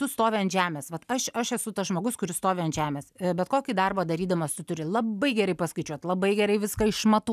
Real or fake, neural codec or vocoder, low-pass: real; none; 14.4 kHz